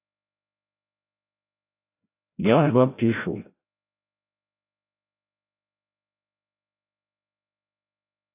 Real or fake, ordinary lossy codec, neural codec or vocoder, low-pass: fake; AAC, 24 kbps; codec, 16 kHz, 0.5 kbps, FreqCodec, larger model; 3.6 kHz